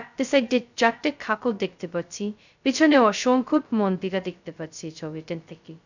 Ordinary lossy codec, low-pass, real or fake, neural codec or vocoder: none; 7.2 kHz; fake; codec, 16 kHz, 0.2 kbps, FocalCodec